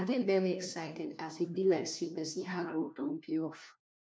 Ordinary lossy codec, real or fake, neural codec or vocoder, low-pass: none; fake; codec, 16 kHz, 1 kbps, FunCodec, trained on LibriTTS, 50 frames a second; none